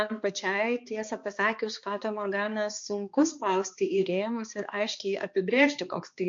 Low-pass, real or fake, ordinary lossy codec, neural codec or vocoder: 7.2 kHz; fake; MP3, 48 kbps; codec, 16 kHz, 2 kbps, X-Codec, HuBERT features, trained on balanced general audio